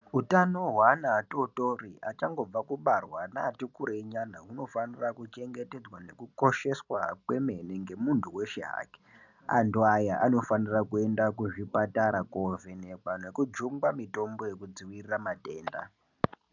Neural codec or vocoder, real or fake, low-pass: none; real; 7.2 kHz